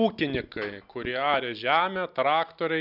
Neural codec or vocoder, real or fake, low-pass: none; real; 5.4 kHz